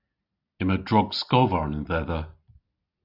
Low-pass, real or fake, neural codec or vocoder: 5.4 kHz; real; none